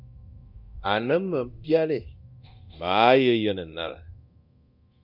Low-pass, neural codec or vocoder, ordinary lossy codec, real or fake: 5.4 kHz; codec, 24 kHz, 0.9 kbps, DualCodec; Opus, 64 kbps; fake